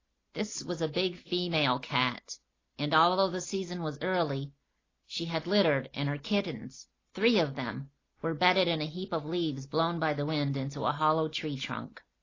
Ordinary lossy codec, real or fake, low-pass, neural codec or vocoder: AAC, 32 kbps; real; 7.2 kHz; none